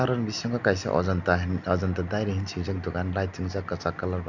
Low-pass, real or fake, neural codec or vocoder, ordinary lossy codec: 7.2 kHz; real; none; none